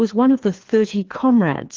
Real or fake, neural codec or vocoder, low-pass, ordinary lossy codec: fake; codec, 16 kHz, 2 kbps, FreqCodec, larger model; 7.2 kHz; Opus, 16 kbps